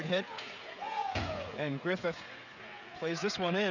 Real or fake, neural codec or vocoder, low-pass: fake; codec, 16 kHz, 16 kbps, FreqCodec, smaller model; 7.2 kHz